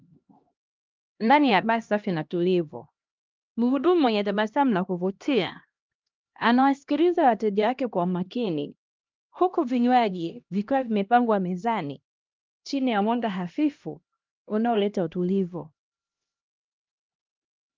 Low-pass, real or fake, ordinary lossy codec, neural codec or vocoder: 7.2 kHz; fake; Opus, 24 kbps; codec, 16 kHz, 1 kbps, X-Codec, HuBERT features, trained on LibriSpeech